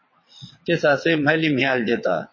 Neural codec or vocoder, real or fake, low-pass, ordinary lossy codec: vocoder, 22.05 kHz, 80 mel bands, WaveNeXt; fake; 7.2 kHz; MP3, 32 kbps